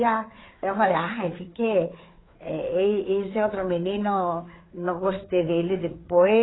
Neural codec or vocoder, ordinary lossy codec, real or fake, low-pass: codec, 16 kHz, 4 kbps, FreqCodec, larger model; AAC, 16 kbps; fake; 7.2 kHz